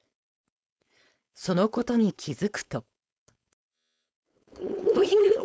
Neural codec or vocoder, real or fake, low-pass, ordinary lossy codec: codec, 16 kHz, 4.8 kbps, FACodec; fake; none; none